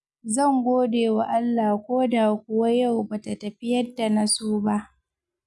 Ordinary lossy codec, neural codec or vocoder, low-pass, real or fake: none; none; none; real